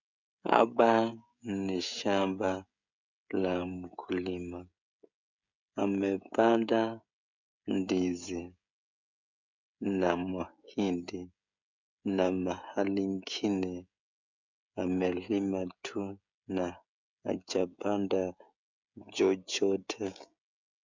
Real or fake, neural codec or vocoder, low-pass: fake; codec, 16 kHz, 16 kbps, FreqCodec, smaller model; 7.2 kHz